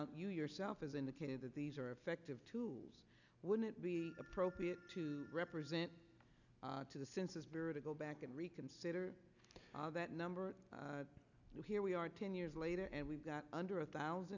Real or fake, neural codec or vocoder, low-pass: real; none; 7.2 kHz